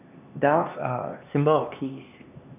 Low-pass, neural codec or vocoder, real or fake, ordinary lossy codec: 3.6 kHz; codec, 16 kHz, 2 kbps, X-Codec, HuBERT features, trained on LibriSpeech; fake; none